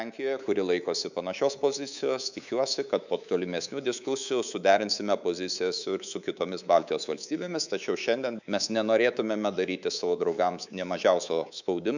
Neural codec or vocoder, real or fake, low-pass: codec, 24 kHz, 3.1 kbps, DualCodec; fake; 7.2 kHz